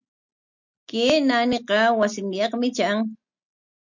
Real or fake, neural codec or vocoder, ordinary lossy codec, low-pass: real; none; MP3, 64 kbps; 7.2 kHz